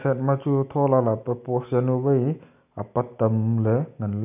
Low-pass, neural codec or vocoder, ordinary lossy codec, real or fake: 3.6 kHz; none; none; real